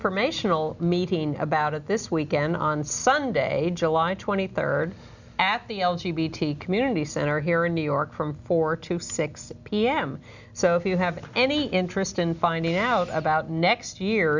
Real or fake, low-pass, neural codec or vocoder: real; 7.2 kHz; none